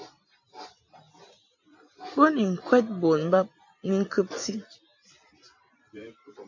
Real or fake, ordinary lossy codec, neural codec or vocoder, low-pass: fake; AAC, 48 kbps; vocoder, 44.1 kHz, 128 mel bands every 256 samples, BigVGAN v2; 7.2 kHz